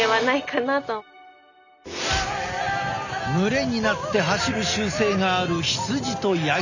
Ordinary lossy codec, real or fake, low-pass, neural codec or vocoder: none; real; 7.2 kHz; none